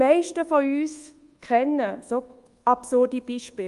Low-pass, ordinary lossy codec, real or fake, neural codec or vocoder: 10.8 kHz; Opus, 32 kbps; fake; codec, 24 kHz, 1.2 kbps, DualCodec